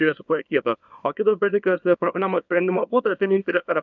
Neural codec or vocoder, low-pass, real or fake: codec, 24 kHz, 0.9 kbps, WavTokenizer, small release; 7.2 kHz; fake